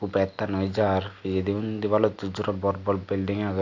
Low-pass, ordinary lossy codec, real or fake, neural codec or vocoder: 7.2 kHz; AAC, 48 kbps; real; none